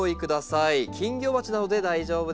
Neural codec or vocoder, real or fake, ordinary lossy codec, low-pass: none; real; none; none